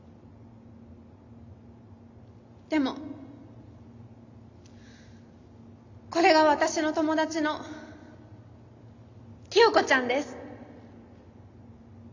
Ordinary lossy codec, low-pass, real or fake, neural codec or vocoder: AAC, 48 kbps; 7.2 kHz; real; none